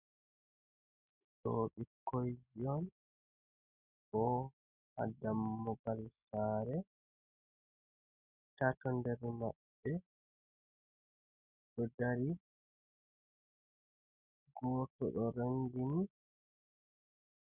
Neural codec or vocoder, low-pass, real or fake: none; 3.6 kHz; real